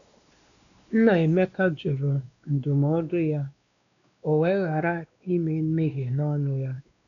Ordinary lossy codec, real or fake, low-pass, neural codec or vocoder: none; fake; 7.2 kHz; codec, 16 kHz, 2 kbps, X-Codec, WavLM features, trained on Multilingual LibriSpeech